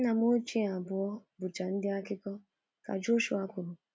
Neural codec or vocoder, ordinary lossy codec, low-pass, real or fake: none; none; none; real